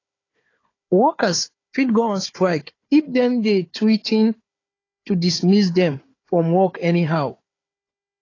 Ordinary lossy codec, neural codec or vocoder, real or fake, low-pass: AAC, 32 kbps; codec, 16 kHz, 4 kbps, FunCodec, trained on Chinese and English, 50 frames a second; fake; 7.2 kHz